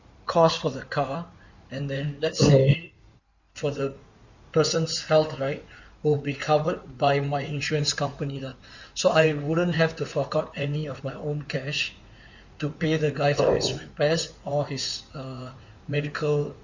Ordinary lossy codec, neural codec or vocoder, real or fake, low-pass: none; codec, 16 kHz in and 24 kHz out, 2.2 kbps, FireRedTTS-2 codec; fake; 7.2 kHz